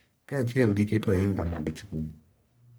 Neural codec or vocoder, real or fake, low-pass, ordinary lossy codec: codec, 44.1 kHz, 1.7 kbps, Pupu-Codec; fake; none; none